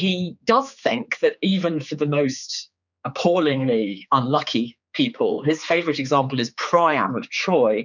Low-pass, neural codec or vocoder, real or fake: 7.2 kHz; codec, 16 kHz, 4 kbps, X-Codec, HuBERT features, trained on general audio; fake